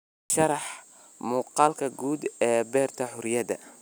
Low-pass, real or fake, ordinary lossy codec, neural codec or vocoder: none; real; none; none